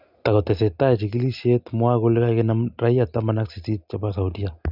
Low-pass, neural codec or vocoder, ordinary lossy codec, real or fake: 5.4 kHz; none; none; real